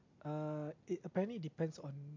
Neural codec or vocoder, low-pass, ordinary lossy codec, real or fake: none; 7.2 kHz; MP3, 48 kbps; real